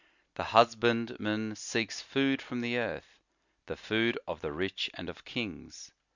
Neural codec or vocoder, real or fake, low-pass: none; real; 7.2 kHz